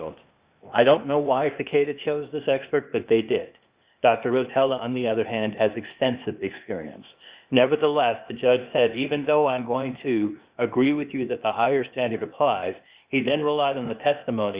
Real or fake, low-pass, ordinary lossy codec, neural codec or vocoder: fake; 3.6 kHz; Opus, 64 kbps; codec, 16 kHz, 0.8 kbps, ZipCodec